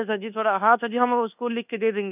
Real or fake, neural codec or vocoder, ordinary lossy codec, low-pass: fake; codec, 24 kHz, 1.2 kbps, DualCodec; none; 3.6 kHz